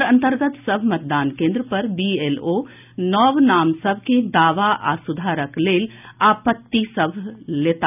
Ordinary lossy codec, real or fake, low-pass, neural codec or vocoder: none; real; 3.6 kHz; none